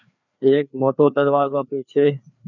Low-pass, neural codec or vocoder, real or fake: 7.2 kHz; codec, 16 kHz, 2 kbps, FreqCodec, larger model; fake